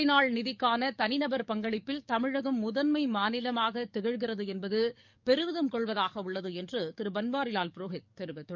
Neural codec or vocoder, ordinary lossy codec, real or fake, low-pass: codec, 44.1 kHz, 7.8 kbps, DAC; none; fake; 7.2 kHz